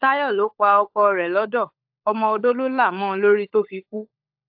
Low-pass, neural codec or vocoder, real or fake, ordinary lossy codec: 5.4 kHz; codec, 16 kHz, 16 kbps, FunCodec, trained on LibriTTS, 50 frames a second; fake; AAC, 48 kbps